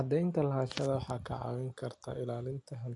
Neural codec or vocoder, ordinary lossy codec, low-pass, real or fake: none; AAC, 64 kbps; 10.8 kHz; real